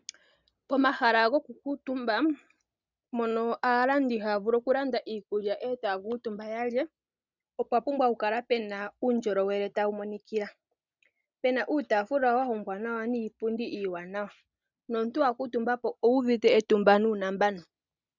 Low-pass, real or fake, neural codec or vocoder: 7.2 kHz; real; none